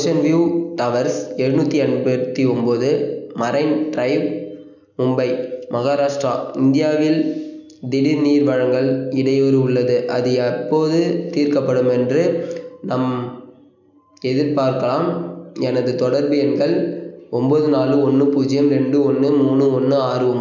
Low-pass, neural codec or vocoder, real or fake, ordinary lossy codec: 7.2 kHz; none; real; none